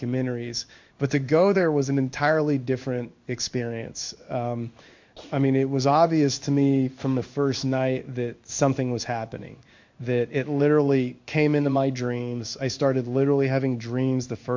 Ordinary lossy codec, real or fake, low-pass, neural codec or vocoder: MP3, 48 kbps; fake; 7.2 kHz; codec, 16 kHz in and 24 kHz out, 1 kbps, XY-Tokenizer